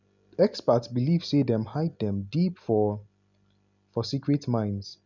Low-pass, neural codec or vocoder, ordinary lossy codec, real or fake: 7.2 kHz; none; none; real